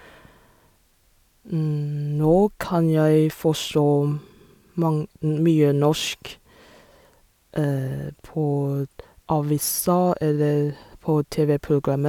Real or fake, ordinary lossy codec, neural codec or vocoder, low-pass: real; none; none; 19.8 kHz